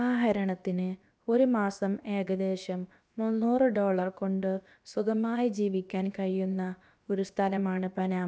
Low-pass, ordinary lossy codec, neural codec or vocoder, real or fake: none; none; codec, 16 kHz, about 1 kbps, DyCAST, with the encoder's durations; fake